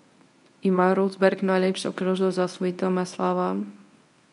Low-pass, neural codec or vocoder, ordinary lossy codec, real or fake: 10.8 kHz; codec, 24 kHz, 0.9 kbps, WavTokenizer, medium speech release version 1; MP3, 96 kbps; fake